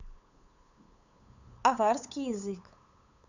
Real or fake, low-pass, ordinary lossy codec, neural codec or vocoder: fake; 7.2 kHz; none; codec, 16 kHz, 8 kbps, FunCodec, trained on LibriTTS, 25 frames a second